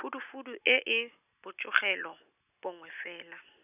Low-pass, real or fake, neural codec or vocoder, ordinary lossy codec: 3.6 kHz; real; none; none